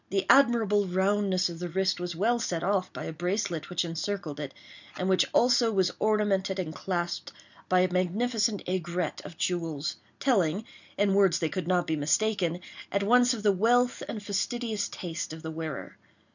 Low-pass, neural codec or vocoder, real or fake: 7.2 kHz; none; real